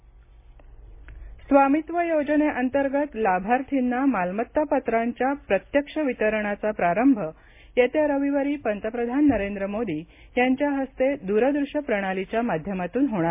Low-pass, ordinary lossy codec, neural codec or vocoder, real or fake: 3.6 kHz; MP3, 24 kbps; none; real